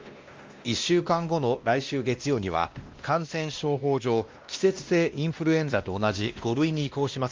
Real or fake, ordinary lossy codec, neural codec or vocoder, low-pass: fake; Opus, 32 kbps; codec, 16 kHz, 1 kbps, X-Codec, WavLM features, trained on Multilingual LibriSpeech; 7.2 kHz